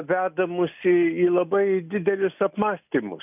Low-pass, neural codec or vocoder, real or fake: 3.6 kHz; none; real